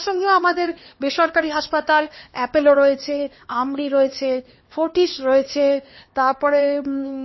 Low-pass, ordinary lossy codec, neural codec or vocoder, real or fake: 7.2 kHz; MP3, 24 kbps; codec, 16 kHz, 2 kbps, X-Codec, HuBERT features, trained on LibriSpeech; fake